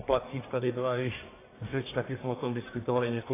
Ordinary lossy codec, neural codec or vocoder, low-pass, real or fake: AAC, 16 kbps; codec, 44.1 kHz, 1.7 kbps, Pupu-Codec; 3.6 kHz; fake